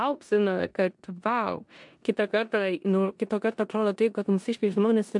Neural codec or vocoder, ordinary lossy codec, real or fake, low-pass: codec, 16 kHz in and 24 kHz out, 0.9 kbps, LongCat-Audio-Codec, four codebook decoder; MP3, 64 kbps; fake; 10.8 kHz